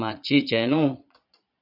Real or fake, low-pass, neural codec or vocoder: fake; 5.4 kHz; vocoder, 22.05 kHz, 80 mel bands, Vocos